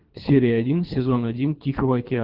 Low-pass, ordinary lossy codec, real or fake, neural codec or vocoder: 5.4 kHz; Opus, 32 kbps; fake; codec, 24 kHz, 3 kbps, HILCodec